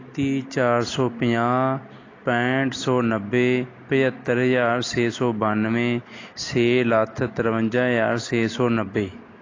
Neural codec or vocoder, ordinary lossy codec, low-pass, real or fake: none; AAC, 32 kbps; 7.2 kHz; real